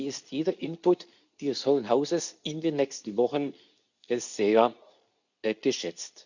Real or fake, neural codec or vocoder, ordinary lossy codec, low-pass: fake; codec, 24 kHz, 0.9 kbps, WavTokenizer, medium speech release version 2; none; 7.2 kHz